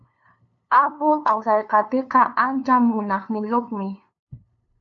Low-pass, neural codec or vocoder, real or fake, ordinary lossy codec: 7.2 kHz; codec, 16 kHz, 2 kbps, FunCodec, trained on LibriTTS, 25 frames a second; fake; AAC, 48 kbps